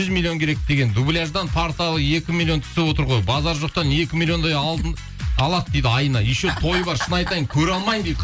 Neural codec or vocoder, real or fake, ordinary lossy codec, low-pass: none; real; none; none